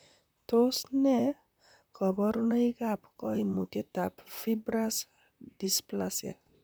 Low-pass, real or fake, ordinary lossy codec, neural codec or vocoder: none; fake; none; vocoder, 44.1 kHz, 128 mel bands, Pupu-Vocoder